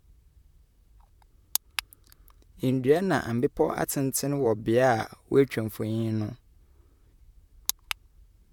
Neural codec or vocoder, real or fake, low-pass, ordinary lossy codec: vocoder, 44.1 kHz, 128 mel bands, Pupu-Vocoder; fake; 19.8 kHz; none